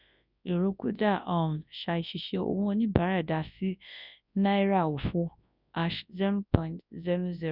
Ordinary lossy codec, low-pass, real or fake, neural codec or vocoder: none; 5.4 kHz; fake; codec, 24 kHz, 0.9 kbps, WavTokenizer, large speech release